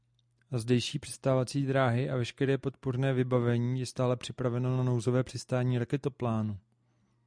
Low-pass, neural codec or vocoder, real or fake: 9.9 kHz; none; real